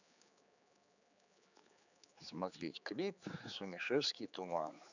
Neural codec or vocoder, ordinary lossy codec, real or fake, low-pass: codec, 16 kHz, 4 kbps, X-Codec, HuBERT features, trained on general audio; none; fake; 7.2 kHz